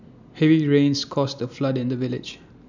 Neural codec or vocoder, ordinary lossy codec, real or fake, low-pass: none; none; real; 7.2 kHz